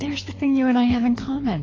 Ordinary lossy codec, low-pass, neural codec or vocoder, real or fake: AAC, 32 kbps; 7.2 kHz; codec, 24 kHz, 6 kbps, HILCodec; fake